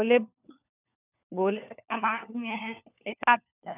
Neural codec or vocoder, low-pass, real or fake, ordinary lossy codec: codec, 16 kHz, 4 kbps, FunCodec, trained on LibriTTS, 50 frames a second; 3.6 kHz; fake; none